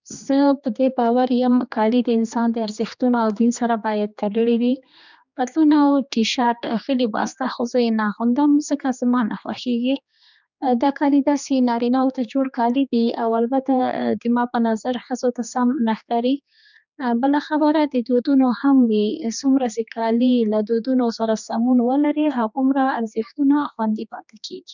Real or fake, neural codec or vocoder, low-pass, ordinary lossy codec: fake; codec, 16 kHz, 2 kbps, X-Codec, HuBERT features, trained on general audio; 7.2 kHz; none